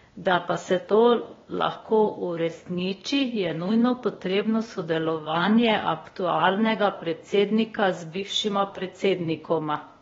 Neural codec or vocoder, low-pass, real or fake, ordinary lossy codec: codec, 16 kHz, 0.8 kbps, ZipCodec; 7.2 kHz; fake; AAC, 24 kbps